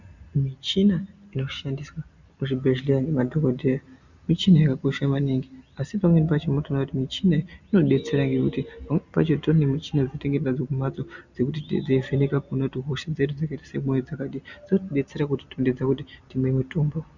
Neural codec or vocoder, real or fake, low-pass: none; real; 7.2 kHz